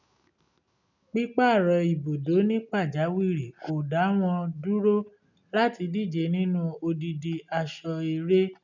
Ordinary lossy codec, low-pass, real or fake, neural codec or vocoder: none; 7.2 kHz; real; none